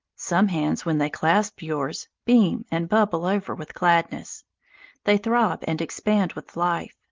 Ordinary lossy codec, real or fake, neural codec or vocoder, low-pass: Opus, 32 kbps; real; none; 7.2 kHz